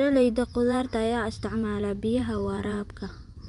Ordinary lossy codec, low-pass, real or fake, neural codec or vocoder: none; 10.8 kHz; fake; vocoder, 24 kHz, 100 mel bands, Vocos